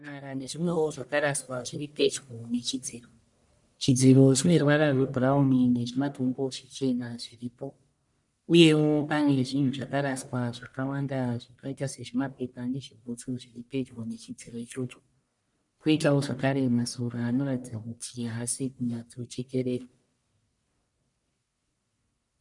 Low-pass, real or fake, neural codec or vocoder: 10.8 kHz; fake; codec, 44.1 kHz, 1.7 kbps, Pupu-Codec